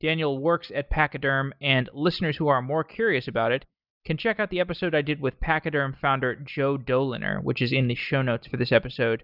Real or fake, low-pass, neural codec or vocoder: real; 5.4 kHz; none